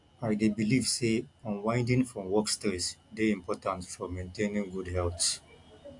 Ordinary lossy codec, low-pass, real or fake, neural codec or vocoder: AAC, 64 kbps; 10.8 kHz; real; none